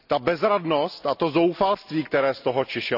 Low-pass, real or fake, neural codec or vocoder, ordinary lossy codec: 5.4 kHz; real; none; none